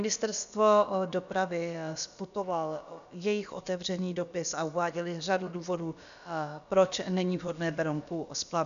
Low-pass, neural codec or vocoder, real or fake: 7.2 kHz; codec, 16 kHz, about 1 kbps, DyCAST, with the encoder's durations; fake